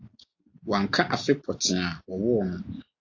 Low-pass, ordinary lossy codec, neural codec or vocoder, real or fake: 7.2 kHz; AAC, 48 kbps; none; real